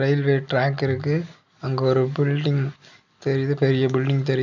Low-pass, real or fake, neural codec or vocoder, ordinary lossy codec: 7.2 kHz; real; none; none